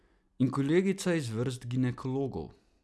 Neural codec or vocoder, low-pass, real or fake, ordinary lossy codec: none; none; real; none